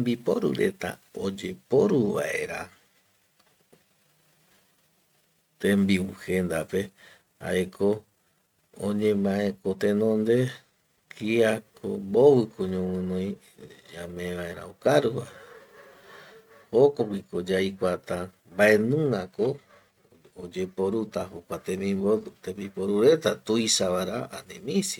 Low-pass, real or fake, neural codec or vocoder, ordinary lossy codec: 19.8 kHz; real; none; MP3, 96 kbps